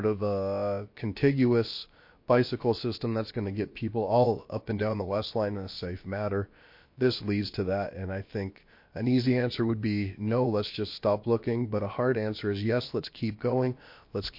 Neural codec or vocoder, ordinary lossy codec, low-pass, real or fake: codec, 16 kHz, about 1 kbps, DyCAST, with the encoder's durations; MP3, 32 kbps; 5.4 kHz; fake